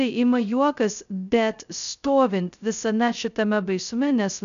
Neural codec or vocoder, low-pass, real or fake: codec, 16 kHz, 0.2 kbps, FocalCodec; 7.2 kHz; fake